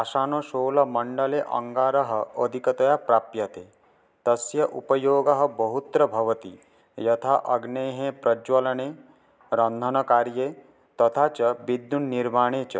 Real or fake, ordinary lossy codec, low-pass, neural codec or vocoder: real; none; none; none